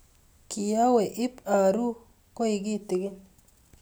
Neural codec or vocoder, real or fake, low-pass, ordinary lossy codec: none; real; none; none